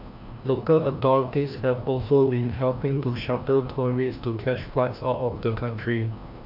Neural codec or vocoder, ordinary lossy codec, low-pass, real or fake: codec, 16 kHz, 1 kbps, FreqCodec, larger model; none; 5.4 kHz; fake